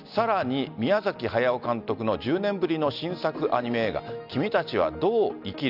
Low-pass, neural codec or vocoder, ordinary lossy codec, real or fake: 5.4 kHz; none; none; real